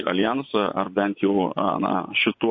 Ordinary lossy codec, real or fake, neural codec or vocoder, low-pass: MP3, 32 kbps; fake; vocoder, 24 kHz, 100 mel bands, Vocos; 7.2 kHz